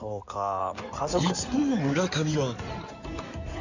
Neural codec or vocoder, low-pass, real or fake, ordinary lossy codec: codec, 16 kHz in and 24 kHz out, 2.2 kbps, FireRedTTS-2 codec; 7.2 kHz; fake; none